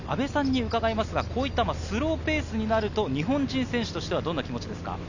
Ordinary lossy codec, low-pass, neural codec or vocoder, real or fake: none; 7.2 kHz; none; real